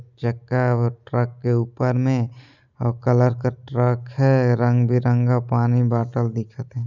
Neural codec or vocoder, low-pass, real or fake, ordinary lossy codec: none; 7.2 kHz; real; none